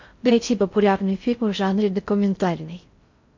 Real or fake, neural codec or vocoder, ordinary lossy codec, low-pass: fake; codec, 16 kHz in and 24 kHz out, 0.6 kbps, FocalCodec, streaming, 4096 codes; MP3, 48 kbps; 7.2 kHz